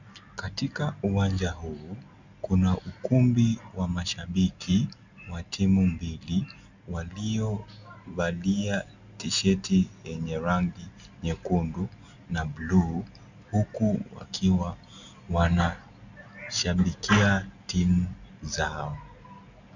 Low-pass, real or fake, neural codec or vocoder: 7.2 kHz; real; none